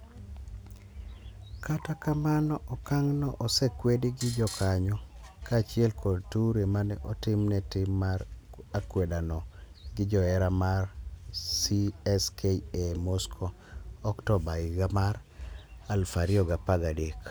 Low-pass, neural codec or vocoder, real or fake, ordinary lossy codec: none; none; real; none